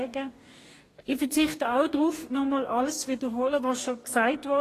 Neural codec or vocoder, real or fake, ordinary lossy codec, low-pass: codec, 44.1 kHz, 2.6 kbps, DAC; fake; AAC, 48 kbps; 14.4 kHz